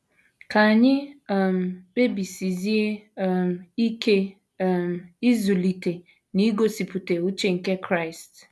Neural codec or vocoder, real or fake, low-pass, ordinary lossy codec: none; real; none; none